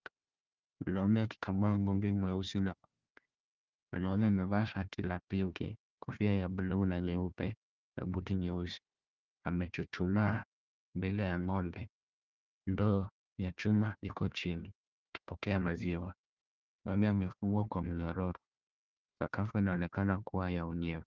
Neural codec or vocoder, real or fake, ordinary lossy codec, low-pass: codec, 16 kHz, 1 kbps, FunCodec, trained on Chinese and English, 50 frames a second; fake; Opus, 24 kbps; 7.2 kHz